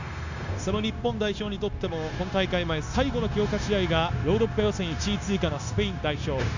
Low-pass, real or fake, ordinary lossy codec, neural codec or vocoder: 7.2 kHz; fake; none; codec, 16 kHz, 0.9 kbps, LongCat-Audio-Codec